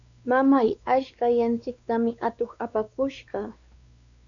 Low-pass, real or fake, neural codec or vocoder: 7.2 kHz; fake; codec, 16 kHz, 2 kbps, X-Codec, WavLM features, trained on Multilingual LibriSpeech